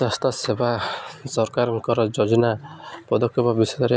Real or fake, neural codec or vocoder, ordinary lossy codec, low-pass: real; none; none; none